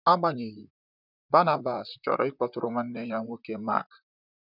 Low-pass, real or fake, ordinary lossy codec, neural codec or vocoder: 5.4 kHz; fake; none; vocoder, 44.1 kHz, 128 mel bands, Pupu-Vocoder